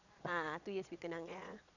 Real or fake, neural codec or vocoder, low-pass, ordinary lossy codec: fake; vocoder, 22.05 kHz, 80 mel bands, Vocos; 7.2 kHz; none